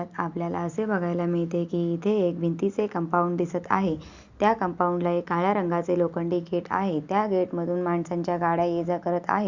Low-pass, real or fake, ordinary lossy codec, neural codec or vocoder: 7.2 kHz; real; Opus, 64 kbps; none